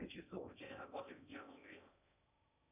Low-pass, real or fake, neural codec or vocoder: 3.6 kHz; fake; codec, 16 kHz in and 24 kHz out, 0.6 kbps, FocalCodec, streaming, 4096 codes